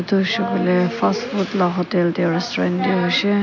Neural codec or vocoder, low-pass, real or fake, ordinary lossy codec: none; 7.2 kHz; real; none